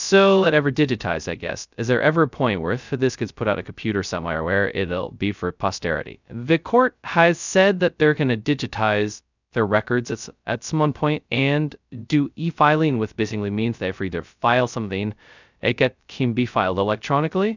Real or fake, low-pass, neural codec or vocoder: fake; 7.2 kHz; codec, 16 kHz, 0.2 kbps, FocalCodec